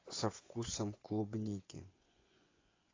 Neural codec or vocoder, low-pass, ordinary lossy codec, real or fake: vocoder, 24 kHz, 100 mel bands, Vocos; 7.2 kHz; AAC, 32 kbps; fake